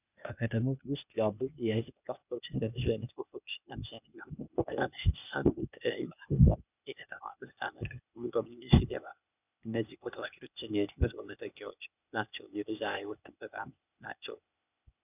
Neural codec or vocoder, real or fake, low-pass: codec, 16 kHz, 0.8 kbps, ZipCodec; fake; 3.6 kHz